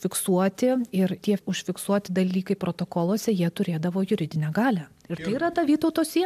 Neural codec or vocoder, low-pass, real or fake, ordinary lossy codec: none; 14.4 kHz; real; MP3, 96 kbps